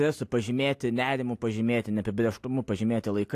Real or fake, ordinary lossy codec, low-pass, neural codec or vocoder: real; AAC, 64 kbps; 14.4 kHz; none